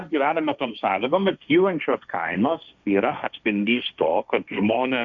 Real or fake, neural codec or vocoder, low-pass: fake; codec, 16 kHz, 1.1 kbps, Voila-Tokenizer; 7.2 kHz